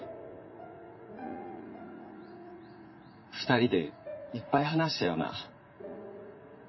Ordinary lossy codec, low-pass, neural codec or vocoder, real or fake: MP3, 24 kbps; 7.2 kHz; vocoder, 44.1 kHz, 80 mel bands, Vocos; fake